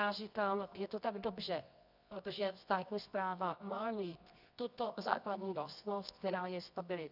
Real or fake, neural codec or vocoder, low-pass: fake; codec, 24 kHz, 0.9 kbps, WavTokenizer, medium music audio release; 5.4 kHz